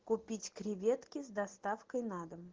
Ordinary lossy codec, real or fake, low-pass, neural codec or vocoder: Opus, 16 kbps; real; 7.2 kHz; none